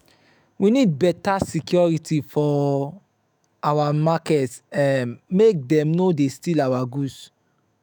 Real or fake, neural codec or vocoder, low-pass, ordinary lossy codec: fake; autoencoder, 48 kHz, 128 numbers a frame, DAC-VAE, trained on Japanese speech; none; none